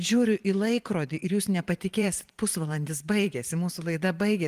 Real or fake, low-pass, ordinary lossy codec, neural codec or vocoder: fake; 14.4 kHz; Opus, 24 kbps; vocoder, 44.1 kHz, 128 mel bands every 512 samples, BigVGAN v2